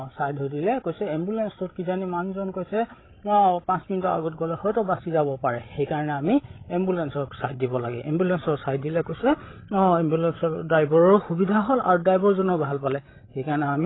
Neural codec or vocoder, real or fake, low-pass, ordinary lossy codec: codec, 16 kHz, 16 kbps, FreqCodec, larger model; fake; 7.2 kHz; AAC, 16 kbps